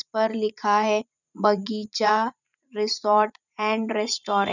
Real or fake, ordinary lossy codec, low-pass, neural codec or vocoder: fake; none; 7.2 kHz; vocoder, 44.1 kHz, 80 mel bands, Vocos